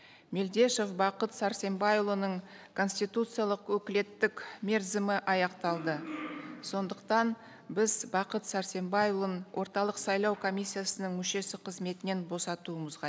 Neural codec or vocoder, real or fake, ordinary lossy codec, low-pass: none; real; none; none